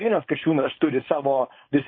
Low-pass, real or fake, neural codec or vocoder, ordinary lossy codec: 7.2 kHz; fake; codec, 16 kHz, 4.8 kbps, FACodec; MP3, 24 kbps